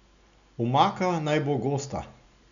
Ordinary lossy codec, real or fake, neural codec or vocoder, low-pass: none; real; none; 7.2 kHz